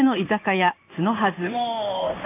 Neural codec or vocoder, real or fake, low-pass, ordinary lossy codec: codec, 16 kHz in and 24 kHz out, 1 kbps, XY-Tokenizer; fake; 3.6 kHz; AAC, 16 kbps